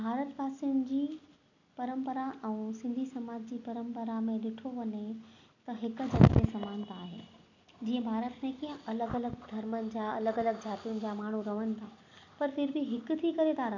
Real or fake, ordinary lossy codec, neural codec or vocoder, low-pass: real; none; none; 7.2 kHz